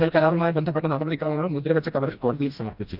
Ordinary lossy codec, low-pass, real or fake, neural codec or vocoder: Opus, 64 kbps; 5.4 kHz; fake; codec, 16 kHz, 1 kbps, FreqCodec, smaller model